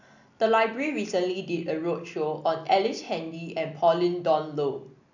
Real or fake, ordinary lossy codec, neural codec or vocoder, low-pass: real; none; none; 7.2 kHz